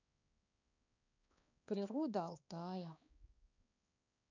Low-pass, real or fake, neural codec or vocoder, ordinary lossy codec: 7.2 kHz; fake; codec, 16 kHz, 2 kbps, X-Codec, HuBERT features, trained on balanced general audio; none